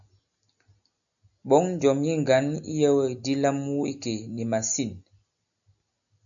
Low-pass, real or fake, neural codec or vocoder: 7.2 kHz; real; none